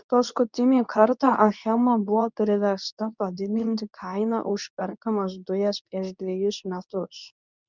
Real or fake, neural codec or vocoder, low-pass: fake; codec, 24 kHz, 0.9 kbps, WavTokenizer, medium speech release version 2; 7.2 kHz